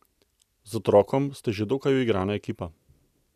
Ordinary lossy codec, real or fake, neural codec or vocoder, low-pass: none; real; none; 14.4 kHz